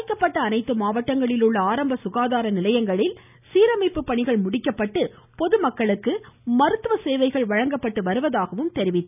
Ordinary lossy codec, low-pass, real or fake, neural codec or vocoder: none; 3.6 kHz; real; none